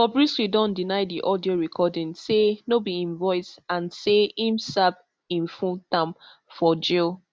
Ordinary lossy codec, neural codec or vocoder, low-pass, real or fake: none; none; none; real